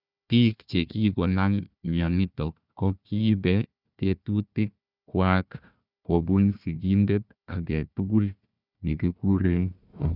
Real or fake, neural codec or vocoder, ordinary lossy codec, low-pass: fake; codec, 16 kHz, 1 kbps, FunCodec, trained on Chinese and English, 50 frames a second; none; 5.4 kHz